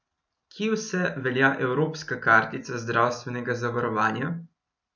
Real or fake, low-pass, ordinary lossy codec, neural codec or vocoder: real; 7.2 kHz; none; none